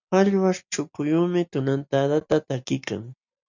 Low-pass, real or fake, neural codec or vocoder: 7.2 kHz; real; none